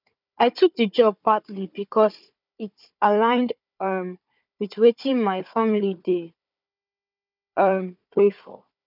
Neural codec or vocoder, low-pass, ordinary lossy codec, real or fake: codec, 16 kHz, 4 kbps, FunCodec, trained on Chinese and English, 50 frames a second; 5.4 kHz; none; fake